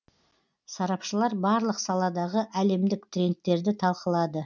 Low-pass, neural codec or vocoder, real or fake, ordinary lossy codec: 7.2 kHz; none; real; none